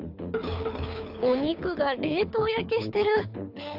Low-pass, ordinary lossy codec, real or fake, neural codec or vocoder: 5.4 kHz; AAC, 48 kbps; fake; codec, 24 kHz, 6 kbps, HILCodec